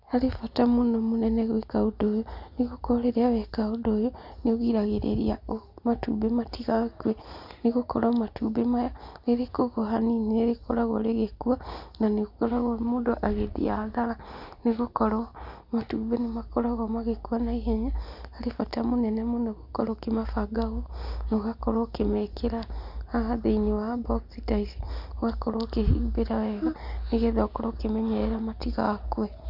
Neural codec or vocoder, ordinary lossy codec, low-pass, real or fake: none; none; 5.4 kHz; real